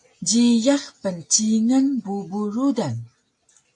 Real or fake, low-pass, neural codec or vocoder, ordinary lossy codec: real; 10.8 kHz; none; AAC, 64 kbps